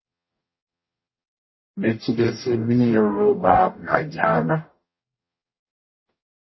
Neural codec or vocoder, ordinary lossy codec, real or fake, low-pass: codec, 44.1 kHz, 0.9 kbps, DAC; MP3, 24 kbps; fake; 7.2 kHz